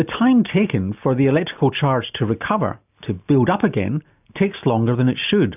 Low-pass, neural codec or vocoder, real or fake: 3.6 kHz; none; real